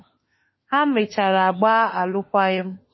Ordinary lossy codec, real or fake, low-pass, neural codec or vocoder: MP3, 24 kbps; fake; 7.2 kHz; autoencoder, 48 kHz, 32 numbers a frame, DAC-VAE, trained on Japanese speech